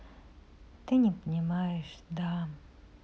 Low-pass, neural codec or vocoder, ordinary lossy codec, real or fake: none; none; none; real